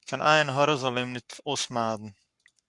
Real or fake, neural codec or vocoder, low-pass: fake; codec, 44.1 kHz, 7.8 kbps, Pupu-Codec; 10.8 kHz